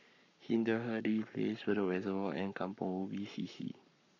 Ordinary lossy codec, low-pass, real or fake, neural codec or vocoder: none; 7.2 kHz; fake; codec, 44.1 kHz, 7.8 kbps, Pupu-Codec